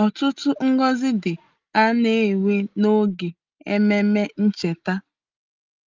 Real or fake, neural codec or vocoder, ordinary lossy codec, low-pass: real; none; Opus, 32 kbps; 7.2 kHz